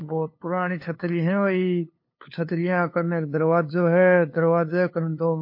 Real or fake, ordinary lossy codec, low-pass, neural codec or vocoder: fake; MP3, 32 kbps; 5.4 kHz; codec, 16 kHz, 2 kbps, FunCodec, trained on LibriTTS, 25 frames a second